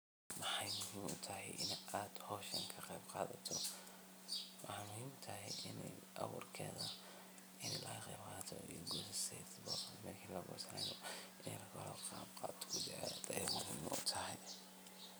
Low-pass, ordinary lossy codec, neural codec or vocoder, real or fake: none; none; none; real